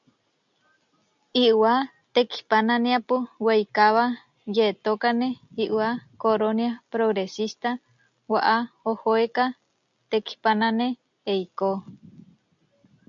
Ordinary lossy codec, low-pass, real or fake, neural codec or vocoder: MP3, 64 kbps; 7.2 kHz; real; none